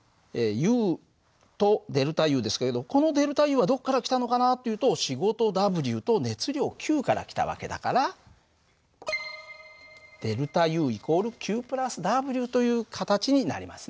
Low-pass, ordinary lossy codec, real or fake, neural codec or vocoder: none; none; real; none